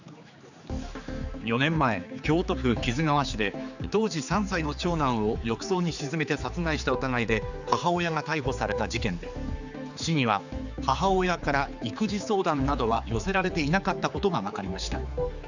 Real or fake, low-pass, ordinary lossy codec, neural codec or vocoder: fake; 7.2 kHz; none; codec, 16 kHz, 4 kbps, X-Codec, HuBERT features, trained on general audio